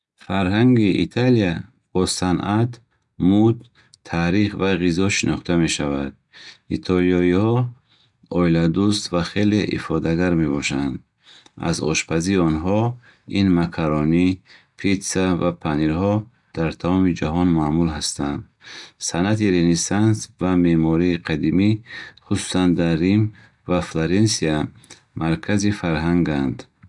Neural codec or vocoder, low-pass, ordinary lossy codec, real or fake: none; 10.8 kHz; none; real